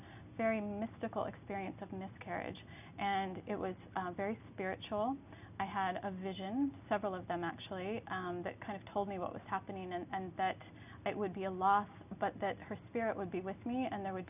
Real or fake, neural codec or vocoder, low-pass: real; none; 3.6 kHz